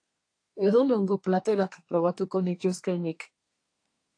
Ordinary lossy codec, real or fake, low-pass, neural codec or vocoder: AAC, 48 kbps; fake; 9.9 kHz; codec, 24 kHz, 1 kbps, SNAC